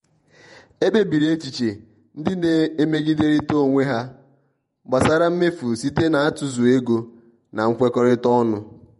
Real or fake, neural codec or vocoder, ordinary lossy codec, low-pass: fake; vocoder, 48 kHz, 128 mel bands, Vocos; MP3, 48 kbps; 19.8 kHz